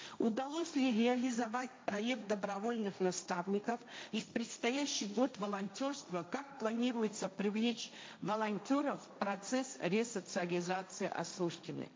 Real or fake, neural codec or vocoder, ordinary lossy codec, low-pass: fake; codec, 16 kHz, 1.1 kbps, Voila-Tokenizer; none; none